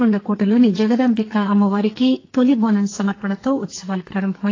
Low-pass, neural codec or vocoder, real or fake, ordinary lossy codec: 7.2 kHz; codec, 44.1 kHz, 2.6 kbps, SNAC; fake; AAC, 32 kbps